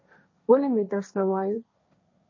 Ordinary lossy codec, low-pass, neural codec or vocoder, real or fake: MP3, 32 kbps; 7.2 kHz; codec, 16 kHz, 1.1 kbps, Voila-Tokenizer; fake